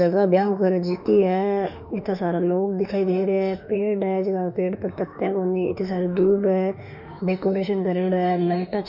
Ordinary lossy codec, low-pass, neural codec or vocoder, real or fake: none; 5.4 kHz; autoencoder, 48 kHz, 32 numbers a frame, DAC-VAE, trained on Japanese speech; fake